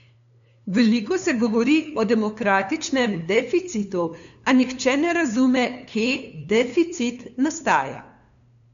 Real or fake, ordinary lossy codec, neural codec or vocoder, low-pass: fake; none; codec, 16 kHz, 2 kbps, FunCodec, trained on LibriTTS, 25 frames a second; 7.2 kHz